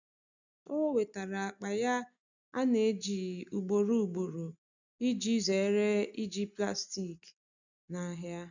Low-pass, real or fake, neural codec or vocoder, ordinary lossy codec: 7.2 kHz; real; none; none